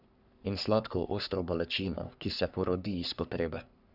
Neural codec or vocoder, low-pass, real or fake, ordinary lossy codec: codec, 44.1 kHz, 3.4 kbps, Pupu-Codec; 5.4 kHz; fake; none